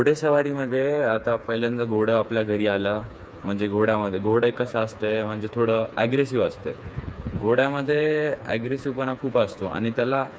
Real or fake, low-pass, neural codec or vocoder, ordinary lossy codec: fake; none; codec, 16 kHz, 4 kbps, FreqCodec, smaller model; none